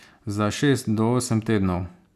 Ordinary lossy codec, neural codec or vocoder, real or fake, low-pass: none; none; real; 14.4 kHz